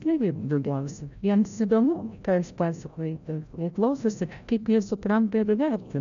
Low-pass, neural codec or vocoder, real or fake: 7.2 kHz; codec, 16 kHz, 0.5 kbps, FreqCodec, larger model; fake